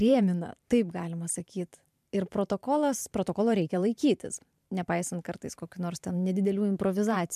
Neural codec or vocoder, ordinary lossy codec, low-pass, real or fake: vocoder, 44.1 kHz, 128 mel bands every 512 samples, BigVGAN v2; MP3, 96 kbps; 14.4 kHz; fake